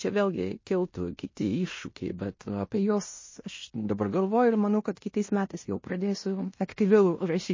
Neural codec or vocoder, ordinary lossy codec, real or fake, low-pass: codec, 16 kHz in and 24 kHz out, 0.9 kbps, LongCat-Audio-Codec, fine tuned four codebook decoder; MP3, 32 kbps; fake; 7.2 kHz